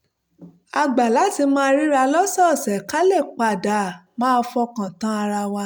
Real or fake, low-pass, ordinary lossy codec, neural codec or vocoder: real; none; none; none